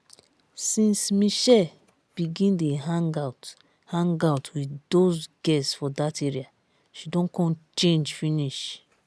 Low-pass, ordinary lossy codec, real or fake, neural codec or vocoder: none; none; real; none